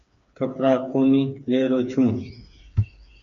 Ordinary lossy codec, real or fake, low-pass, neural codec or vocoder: MP3, 64 kbps; fake; 7.2 kHz; codec, 16 kHz, 4 kbps, FreqCodec, smaller model